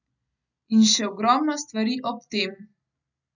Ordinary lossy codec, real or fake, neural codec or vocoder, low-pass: none; real; none; 7.2 kHz